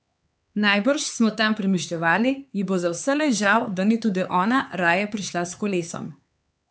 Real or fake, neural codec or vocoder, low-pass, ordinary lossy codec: fake; codec, 16 kHz, 4 kbps, X-Codec, HuBERT features, trained on LibriSpeech; none; none